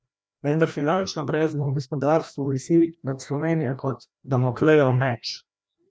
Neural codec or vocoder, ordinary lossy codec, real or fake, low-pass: codec, 16 kHz, 1 kbps, FreqCodec, larger model; none; fake; none